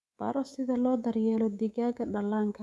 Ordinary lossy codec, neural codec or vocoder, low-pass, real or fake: none; codec, 24 kHz, 3.1 kbps, DualCodec; none; fake